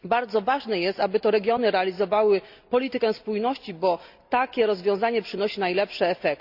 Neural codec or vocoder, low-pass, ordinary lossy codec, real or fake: none; 5.4 kHz; Opus, 64 kbps; real